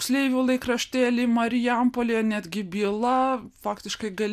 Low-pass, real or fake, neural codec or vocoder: 14.4 kHz; real; none